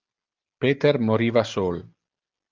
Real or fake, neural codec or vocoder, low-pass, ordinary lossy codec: real; none; 7.2 kHz; Opus, 32 kbps